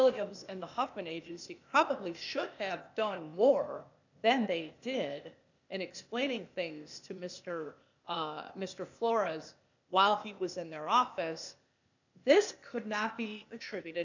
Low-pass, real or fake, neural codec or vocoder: 7.2 kHz; fake; codec, 16 kHz, 0.8 kbps, ZipCodec